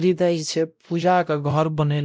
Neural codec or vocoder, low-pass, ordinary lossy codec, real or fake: codec, 16 kHz, 0.5 kbps, X-Codec, WavLM features, trained on Multilingual LibriSpeech; none; none; fake